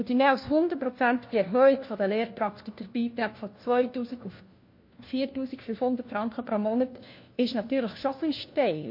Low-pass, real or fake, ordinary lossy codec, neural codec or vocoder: 5.4 kHz; fake; MP3, 32 kbps; codec, 16 kHz, 1 kbps, FunCodec, trained on LibriTTS, 50 frames a second